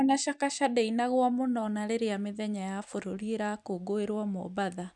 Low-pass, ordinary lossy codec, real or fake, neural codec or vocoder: 10.8 kHz; none; fake; vocoder, 44.1 kHz, 128 mel bands every 256 samples, BigVGAN v2